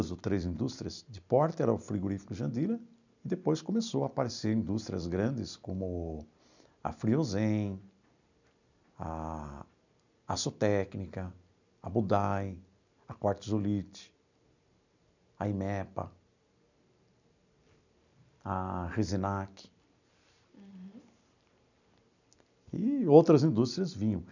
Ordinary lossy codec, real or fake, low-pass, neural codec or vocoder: none; real; 7.2 kHz; none